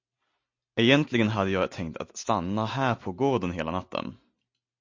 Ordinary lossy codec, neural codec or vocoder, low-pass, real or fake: MP3, 48 kbps; none; 7.2 kHz; real